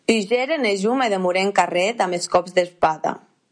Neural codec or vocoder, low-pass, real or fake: none; 9.9 kHz; real